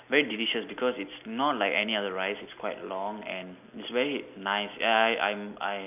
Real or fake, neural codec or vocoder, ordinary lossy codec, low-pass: real; none; none; 3.6 kHz